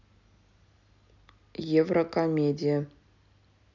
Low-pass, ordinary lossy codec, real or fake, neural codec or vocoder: 7.2 kHz; none; real; none